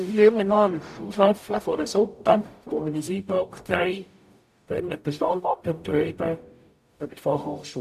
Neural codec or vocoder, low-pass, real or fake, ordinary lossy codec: codec, 44.1 kHz, 0.9 kbps, DAC; 14.4 kHz; fake; none